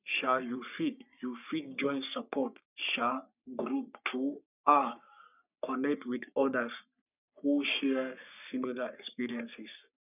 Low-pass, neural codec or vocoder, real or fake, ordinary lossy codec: 3.6 kHz; codec, 44.1 kHz, 3.4 kbps, Pupu-Codec; fake; none